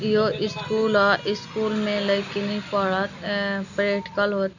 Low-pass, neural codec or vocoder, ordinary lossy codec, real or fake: 7.2 kHz; none; none; real